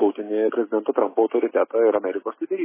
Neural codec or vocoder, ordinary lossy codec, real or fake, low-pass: none; MP3, 16 kbps; real; 3.6 kHz